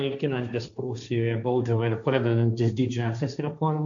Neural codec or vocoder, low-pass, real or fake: codec, 16 kHz, 1.1 kbps, Voila-Tokenizer; 7.2 kHz; fake